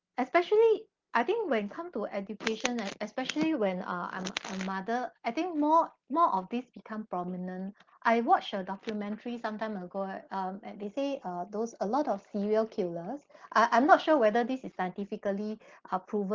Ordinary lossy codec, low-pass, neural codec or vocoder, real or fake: Opus, 16 kbps; 7.2 kHz; none; real